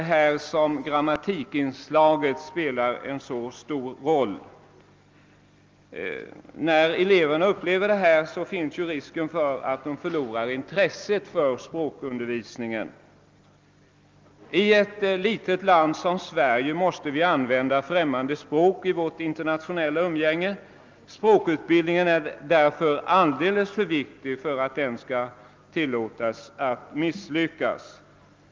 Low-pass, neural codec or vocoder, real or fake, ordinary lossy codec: 7.2 kHz; none; real; Opus, 24 kbps